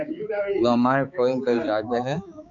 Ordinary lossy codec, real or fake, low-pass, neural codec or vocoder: MP3, 96 kbps; fake; 7.2 kHz; codec, 16 kHz, 4 kbps, X-Codec, HuBERT features, trained on balanced general audio